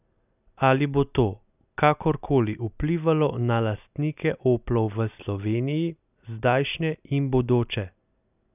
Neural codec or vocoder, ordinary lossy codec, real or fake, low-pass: none; none; real; 3.6 kHz